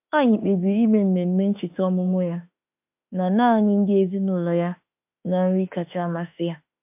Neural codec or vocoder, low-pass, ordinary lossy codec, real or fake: autoencoder, 48 kHz, 32 numbers a frame, DAC-VAE, trained on Japanese speech; 3.6 kHz; MP3, 32 kbps; fake